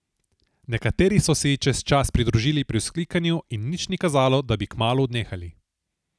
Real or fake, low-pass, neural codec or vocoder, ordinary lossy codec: real; none; none; none